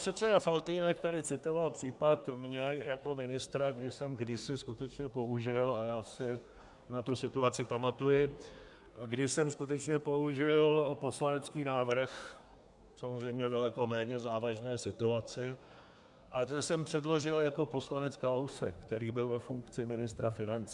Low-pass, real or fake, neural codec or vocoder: 10.8 kHz; fake; codec, 24 kHz, 1 kbps, SNAC